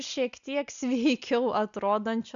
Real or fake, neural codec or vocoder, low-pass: real; none; 7.2 kHz